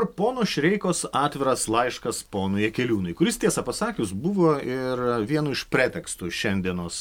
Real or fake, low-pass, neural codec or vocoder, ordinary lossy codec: real; 19.8 kHz; none; MP3, 96 kbps